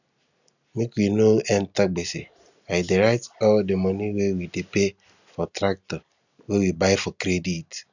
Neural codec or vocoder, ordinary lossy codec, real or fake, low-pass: none; none; real; 7.2 kHz